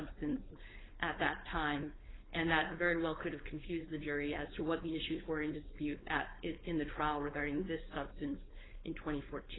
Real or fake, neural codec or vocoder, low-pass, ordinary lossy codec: fake; codec, 16 kHz, 4.8 kbps, FACodec; 7.2 kHz; AAC, 16 kbps